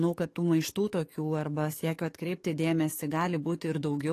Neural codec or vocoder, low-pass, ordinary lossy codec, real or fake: codec, 44.1 kHz, 7.8 kbps, DAC; 14.4 kHz; AAC, 48 kbps; fake